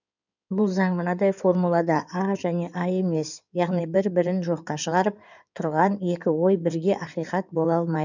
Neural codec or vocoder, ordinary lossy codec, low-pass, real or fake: codec, 16 kHz in and 24 kHz out, 2.2 kbps, FireRedTTS-2 codec; none; 7.2 kHz; fake